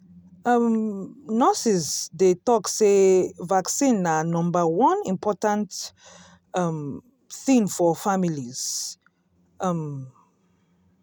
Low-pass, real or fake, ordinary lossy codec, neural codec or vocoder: none; real; none; none